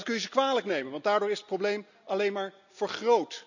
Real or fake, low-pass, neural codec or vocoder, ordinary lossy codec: real; 7.2 kHz; none; none